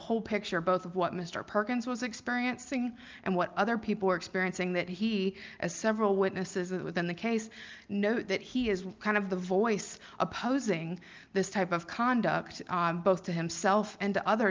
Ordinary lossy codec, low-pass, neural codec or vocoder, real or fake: Opus, 32 kbps; 7.2 kHz; none; real